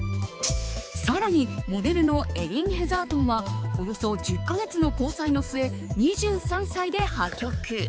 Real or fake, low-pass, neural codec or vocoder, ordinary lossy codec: fake; none; codec, 16 kHz, 4 kbps, X-Codec, HuBERT features, trained on balanced general audio; none